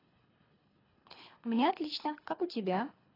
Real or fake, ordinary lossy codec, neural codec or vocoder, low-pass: fake; AAC, 24 kbps; codec, 24 kHz, 3 kbps, HILCodec; 5.4 kHz